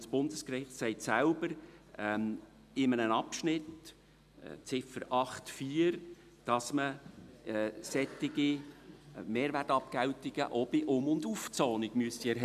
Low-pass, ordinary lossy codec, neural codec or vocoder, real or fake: 14.4 kHz; none; none; real